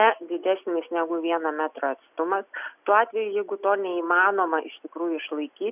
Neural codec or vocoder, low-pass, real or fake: none; 3.6 kHz; real